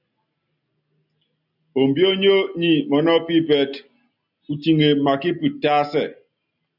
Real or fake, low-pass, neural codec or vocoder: real; 5.4 kHz; none